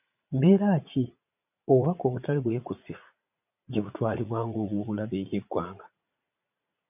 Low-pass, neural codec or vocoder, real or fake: 3.6 kHz; vocoder, 44.1 kHz, 80 mel bands, Vocos; fake